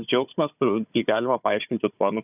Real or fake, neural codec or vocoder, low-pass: fake; codec, 16 kHz, 4 kbps, FunCodec, trained on Chinese and English, 50 frames a second; 3.6 kHz